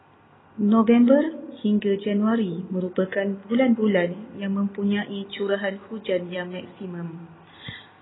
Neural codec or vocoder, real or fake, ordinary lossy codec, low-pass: none; real; AAC, 16 kbps; 7.2 kHz